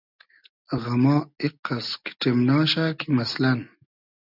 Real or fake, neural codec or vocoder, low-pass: real; none; 5.4 kHz